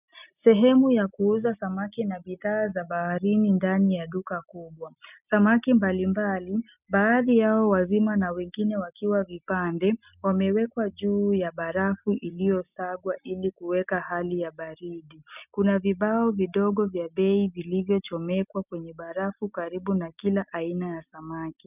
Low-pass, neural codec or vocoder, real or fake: 3.6 kHz; none; real